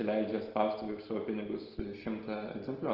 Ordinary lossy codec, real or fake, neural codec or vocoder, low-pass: Opus, 16 kbps; real; none; 5.4 kHz